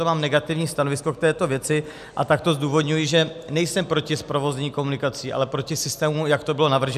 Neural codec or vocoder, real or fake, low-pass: none; real; 14.4 kHz